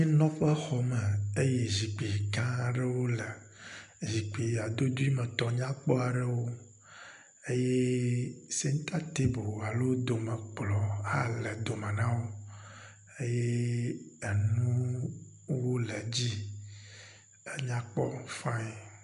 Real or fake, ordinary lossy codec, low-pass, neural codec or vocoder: real; MP3, 64 kbps; 10.8 kHz; none